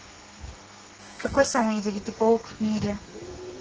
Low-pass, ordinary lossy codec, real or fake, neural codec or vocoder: 7.2 kHz; Opus, 16 kbps; fake; codec, 32 kHz, 1.9 kbps, SNAC